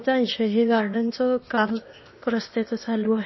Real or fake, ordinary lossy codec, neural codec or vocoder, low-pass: fake; MP3, 24 kbps; codec, 16 kHz, 0.8 kbps, ZipCodec; 7.2 kHz